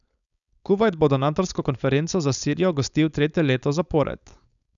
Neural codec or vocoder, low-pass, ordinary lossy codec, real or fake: codec, 16 kHz, 4.8 kbps, FACodec; 7.2 kHz; none; fake